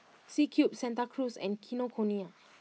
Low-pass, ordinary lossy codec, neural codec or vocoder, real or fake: none; none; none; real